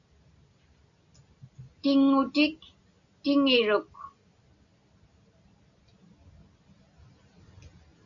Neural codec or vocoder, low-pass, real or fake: none; 7.2 kHz; real